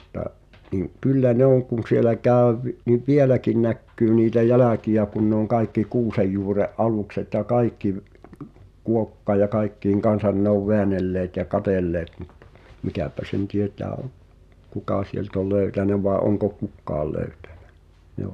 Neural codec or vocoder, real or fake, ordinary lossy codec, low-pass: none; real; none; 14.4 kHz